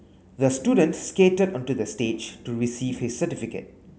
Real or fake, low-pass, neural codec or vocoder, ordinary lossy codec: real; none; none; none